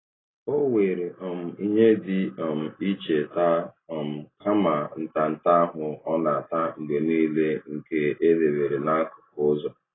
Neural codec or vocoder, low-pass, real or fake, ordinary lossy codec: none; 7.2 kHz; real; AAC, 16 kbps